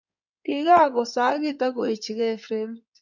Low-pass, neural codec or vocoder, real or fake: 7.2 kHz; codec, 16 kHz in and 24 kHz out, 2.2 kbps, FireRedTTS-2 codec; fake